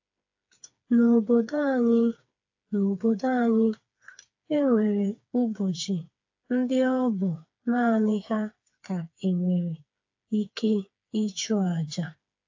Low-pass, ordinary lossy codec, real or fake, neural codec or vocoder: 7.2 kHz; AAC, 48 kbps; fake; codec, 16 kHz, 4 kbps, FreqCodec, smaller model